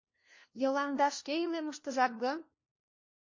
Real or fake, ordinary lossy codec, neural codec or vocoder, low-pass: fake; MP3, 32 kbps; codec, 16 kHz, 1 kbps, FunCodec, trained on LibriTTS, 50 frames a second; 7.2 kHz